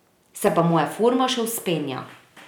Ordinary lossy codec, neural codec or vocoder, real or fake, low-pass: none; none; real; none